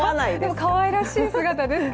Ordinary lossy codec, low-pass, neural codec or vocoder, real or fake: none; none; none; real